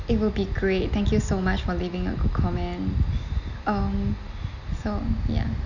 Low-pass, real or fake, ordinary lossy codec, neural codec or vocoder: 7.2 kHz; real; none; none